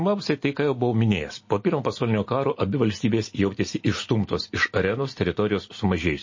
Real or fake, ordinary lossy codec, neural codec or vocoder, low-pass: real; MP3, 32 kbps; none; 7.2 kHz